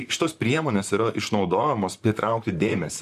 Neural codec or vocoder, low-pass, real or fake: vocoder, 44.1 kHz, 128 mel bands, Pupu-Vocoder; 14.4 kHz; fake